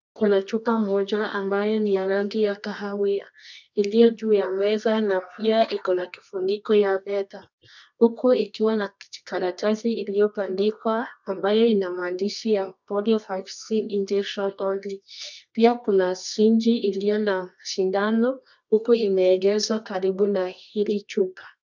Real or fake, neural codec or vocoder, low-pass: fake; codec, 24 kHz, 0.9 kbps, WavTokenizer, medium music audio release; 7.2 kHz